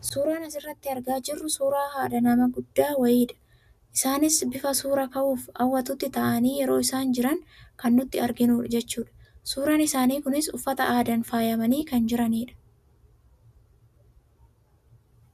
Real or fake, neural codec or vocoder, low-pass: real; none; 14.4 kHz